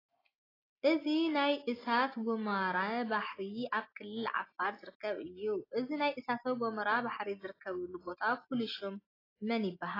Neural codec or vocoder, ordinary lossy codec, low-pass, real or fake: none; AAC, 24 kbps; 5.4 kHz; real